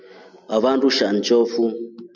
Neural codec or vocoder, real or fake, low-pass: none; real; 7.2 kHz